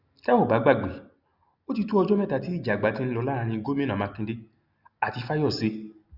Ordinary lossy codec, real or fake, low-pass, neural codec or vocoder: Opus, 64 kbps; real; 5.4 kHz; none